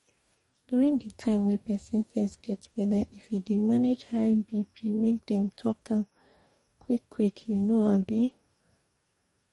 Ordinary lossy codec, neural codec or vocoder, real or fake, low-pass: MP3, 48 kbps; codec, 44.1 kHz, 2.6 kbps, DAC; fake; 19.8 kHz